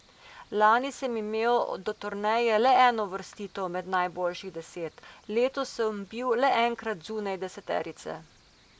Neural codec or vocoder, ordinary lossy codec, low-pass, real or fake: none; none; none; real